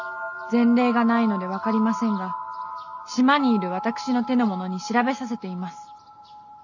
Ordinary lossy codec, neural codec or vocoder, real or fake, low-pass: none; none; real; 7.2 kHz